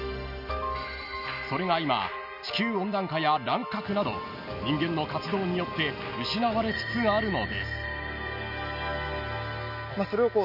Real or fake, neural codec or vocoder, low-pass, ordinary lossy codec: real; none; 5.4 kHz; none